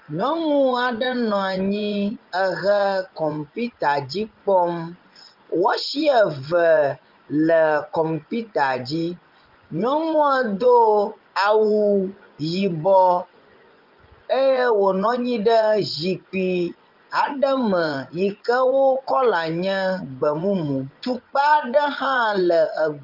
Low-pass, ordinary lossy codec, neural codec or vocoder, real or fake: 5.4 kHz; Opus, 32 kbps; vocoder, 24 kHz, 100 mel bands, Vocos; fake